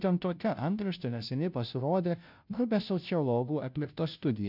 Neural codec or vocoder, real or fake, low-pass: codec, 16 kHz, 0.5 kbps, FunCodec, trained on Chinese and English, 25 frames a second; fake; 5.4 kHz